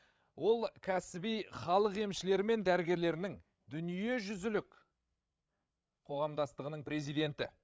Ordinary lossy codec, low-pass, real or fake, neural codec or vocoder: none; none; fake; codec, 16 kHz, 8 kbps, FreqCodec, larger model